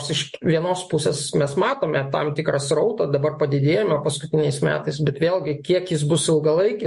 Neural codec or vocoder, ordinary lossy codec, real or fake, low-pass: autoencoder, 48 kHz, 128 numbers a frame, DAC-VAE, trained on Japanese speech; MP3, 48 kbps; fake; 14.4 kHz